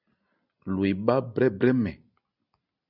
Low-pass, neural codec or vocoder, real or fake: 5.4 kHz; none; real